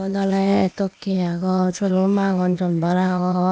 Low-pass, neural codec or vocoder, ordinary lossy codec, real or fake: none; codec, 16 kHz, 0.8 kbps, ZipCodec; none; fake